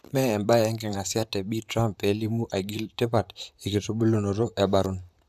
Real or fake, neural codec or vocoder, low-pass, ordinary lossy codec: fake; vocoder, 44.1 kHz, 128 mel bands, Pupu-Vocoder; 14.4 kHz; AAC, 96 kbps